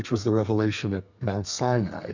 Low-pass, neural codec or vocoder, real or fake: 7.2 kHz; codec, 32 kHz, 1.9 kbps, SNAC; fake